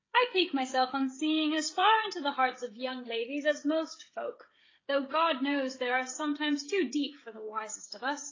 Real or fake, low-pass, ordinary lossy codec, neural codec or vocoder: fake; 7.2 kHz; AAC, 32 kbps; codec, 16 kHz, 16 kbps, FreqCodec, smaller model